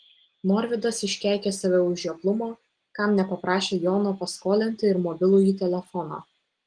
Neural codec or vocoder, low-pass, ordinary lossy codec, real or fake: none; 9.9 kHz; Opus, 24 kbps; real